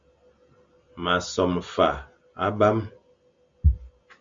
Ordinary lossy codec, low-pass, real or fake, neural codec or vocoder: Opus, 64 kbps; 7.2 kHz; real; none